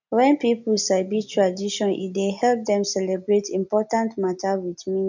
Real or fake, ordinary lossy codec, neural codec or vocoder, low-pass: real; none; none; 7.2 kHz